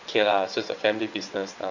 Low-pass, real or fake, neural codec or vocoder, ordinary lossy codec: 7.2 kHz; fake; vocoder, 22.05 kHz, 80 mel bands, WaveNeXt; none